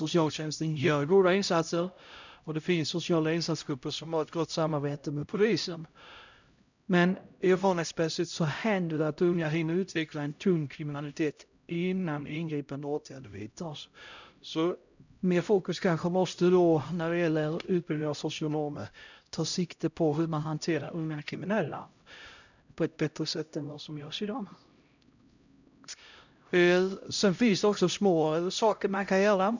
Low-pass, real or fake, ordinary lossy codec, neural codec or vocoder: 7.2 kHz; fake; none; codec, 16 kHz, 0.5 kbps, X-Codec, HuBERT features, trained on LibriSpeech